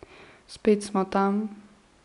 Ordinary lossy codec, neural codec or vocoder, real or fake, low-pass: none; none; real; 10.8 kHz